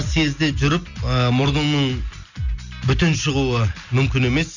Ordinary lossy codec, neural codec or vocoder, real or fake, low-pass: none; none; real; 7.2 kHz